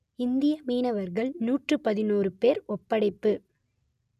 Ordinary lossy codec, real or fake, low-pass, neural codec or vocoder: none; real; 14.4 kHz; none